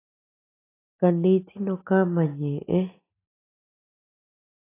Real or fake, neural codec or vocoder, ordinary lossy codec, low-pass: real; none; AAC, 16 kbps; 3.6 kHz